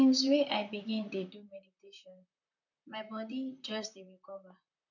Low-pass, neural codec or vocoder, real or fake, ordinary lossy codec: 7.2 kHz; vocoder, 44.1 kHz, 128 mel bands, Pupu-Vocoder; fake; none